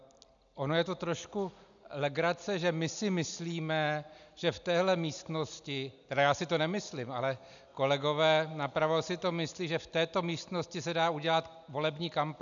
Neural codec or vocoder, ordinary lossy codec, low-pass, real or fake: none; MP3, 96 kbps; 7.2 kHz; real